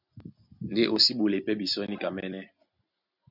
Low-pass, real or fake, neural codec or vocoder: 5.4 kHz; real; none